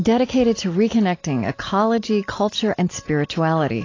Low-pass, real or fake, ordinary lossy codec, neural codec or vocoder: 7.2 kHz; real; AAC, 32 kbps; none